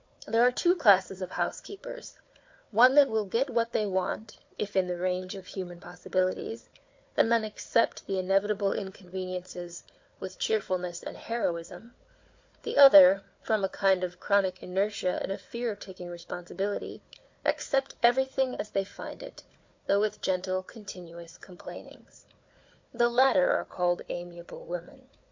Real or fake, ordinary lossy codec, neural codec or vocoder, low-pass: fake; MP3, 48 kbps; codec, 16 kHz, 4 kbps, FunCodec, trained on Chinese and English, 50 frames a second; 7.2 kHz